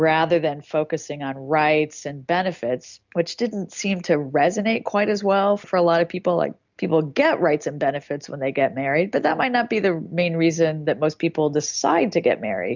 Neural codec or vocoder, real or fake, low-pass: none; real; 7.2 kHz